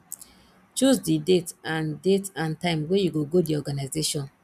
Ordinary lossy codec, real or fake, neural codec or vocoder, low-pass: none; real; none; 14.4 kHz